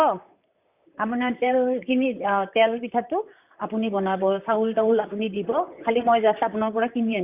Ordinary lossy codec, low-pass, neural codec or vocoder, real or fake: Opus, 64 kbps; 3.6 kHz; vocoder, 44.1 kHz, 128 mel bands, Pupu-Vocoder; fake